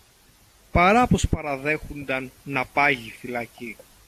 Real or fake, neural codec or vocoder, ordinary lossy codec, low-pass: real; none; AAC, 64 kbps; 14.4 kHz